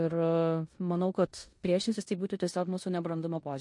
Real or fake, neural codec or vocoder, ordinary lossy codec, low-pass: fake; codec, 16 kHz in and 24 kHz out, 0.9 kbps, LongCat-Audio-Codec, four codebook decoder; MP3, 48 kbps; 10.8 kHz